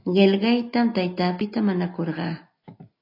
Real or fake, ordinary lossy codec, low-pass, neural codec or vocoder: real; AAC, 32 kbps; 5.4 kHz; none